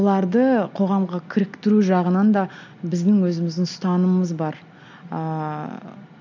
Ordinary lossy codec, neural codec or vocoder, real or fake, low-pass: none; none; real; 7.2 kHz